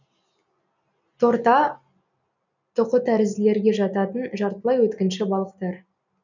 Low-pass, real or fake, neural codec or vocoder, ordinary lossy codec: 7.2 kHz; real; none; none